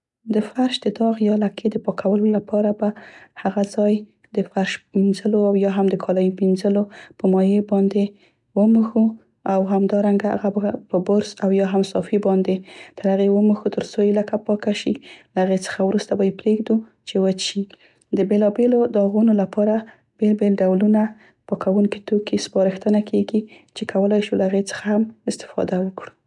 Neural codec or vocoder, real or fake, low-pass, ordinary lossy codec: none; real; 10.8 kHz; none